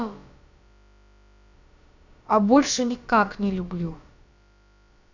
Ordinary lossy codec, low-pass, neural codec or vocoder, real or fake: none; 7.2 kHz; codec, 16 kHz, about 1 kbps, DyCAST, with the encoder's durations; fake